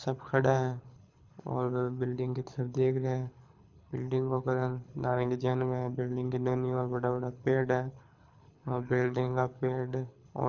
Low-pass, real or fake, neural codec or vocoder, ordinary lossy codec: 7.2 kHz; fake; codec, 24 kHz, 6 kbps, HILCodec; none